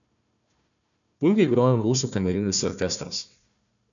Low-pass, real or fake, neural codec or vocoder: 7.2 kHz; fake; codec, 16 kHz, 1 kbps, FunCodec, trained on Chinese and English, 50 frames a second